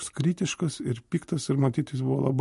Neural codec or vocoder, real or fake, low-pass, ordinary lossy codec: none; real; 14.4 kHz; MP3, 48 kbps